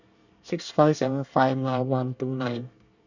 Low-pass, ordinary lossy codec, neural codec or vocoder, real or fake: 7.2 kHz; none; codec, 24 kHz, 1 kbps, SNAC; fake